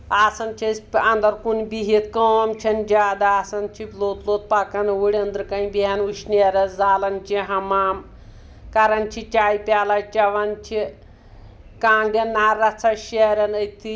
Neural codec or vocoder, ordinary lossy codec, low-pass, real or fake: none; none; none; real